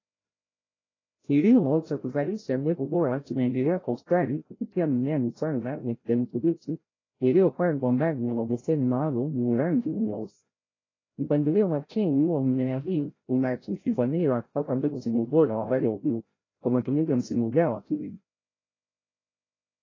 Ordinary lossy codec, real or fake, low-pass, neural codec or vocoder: AAC, 32 kbps; fake; 7.2 kHz; codec, 16 kHz, 0.5 kbps, FreqCodec, larger model